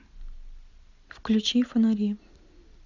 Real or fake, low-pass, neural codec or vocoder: real; 7.2 kHz; none